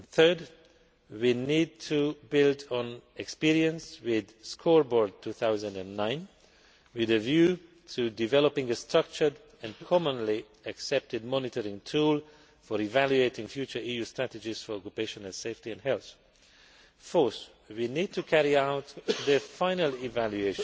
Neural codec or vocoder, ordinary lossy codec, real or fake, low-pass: none; none; real; none